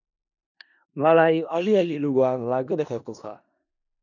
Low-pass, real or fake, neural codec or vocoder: 7.2 kHz; fake; codec, 16 kHz in and 24 kHz out, 0.4 kbps, LongCat-Audio-Codec, four codebook decoder